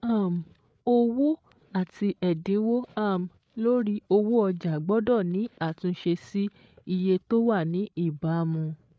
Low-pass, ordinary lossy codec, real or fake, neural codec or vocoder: none; none; fake; codec, 16 kHz, 8 kbps, FreqCodec, larger model